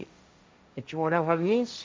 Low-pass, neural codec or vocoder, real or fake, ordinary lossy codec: none; codec, 16 kHz, 1.1 kbps, Voila-Tokenizer; fake; none